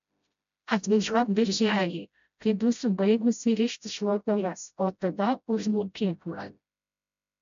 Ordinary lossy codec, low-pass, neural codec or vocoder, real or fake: AAC, 96 kbps; 7.2 kHz; codec, 16 kHz, 0.5 kbps, FreqCodec, smaller model; fake